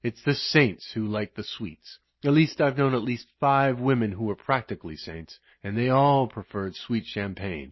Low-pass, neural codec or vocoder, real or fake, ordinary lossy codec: 7.2 kHz; none; real; MP3, 24 kbps